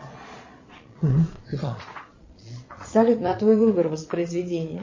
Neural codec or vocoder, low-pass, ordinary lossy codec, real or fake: vocoder, 22.05 kHz, 80 mel bands, Vocos; 7.2 kHz; MP3, 32 kbps; fake